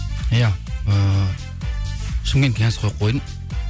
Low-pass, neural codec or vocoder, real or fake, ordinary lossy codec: none; none; real; none